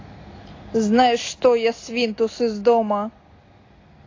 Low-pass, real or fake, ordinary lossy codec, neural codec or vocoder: 7.2 kHz; real; AAC, 32 kbps; none